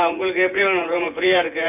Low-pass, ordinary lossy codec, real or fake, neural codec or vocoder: 3.6 kHz; none; fake; vocoder, 24 kHz, 100 mel bands, Vocos